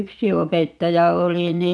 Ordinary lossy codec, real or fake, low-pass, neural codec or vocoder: none; fake; none; vocoder, 22.05 kHz, 80 mel bands, Vocos